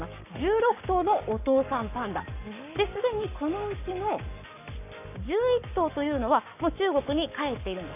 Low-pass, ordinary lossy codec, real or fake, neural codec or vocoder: 3.6 kHz; none; fake; codec, 44.1 kHz, 7.8 kbps, Pupu-Codec